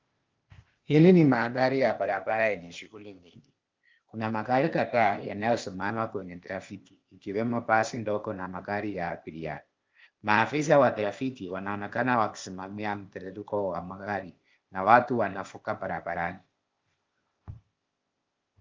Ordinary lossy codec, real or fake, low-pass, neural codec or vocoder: Opus, 32 kbps; fake; 7.2 kHz; codec, 16 kHz, 0.8 kbps, ZipCodec